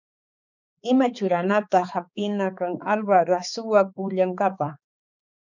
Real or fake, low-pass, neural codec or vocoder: fake; 7.2 kHz; codec, 16 kHz, 4 kbps, X-Codec, HuBERT features, trained on balanced general audio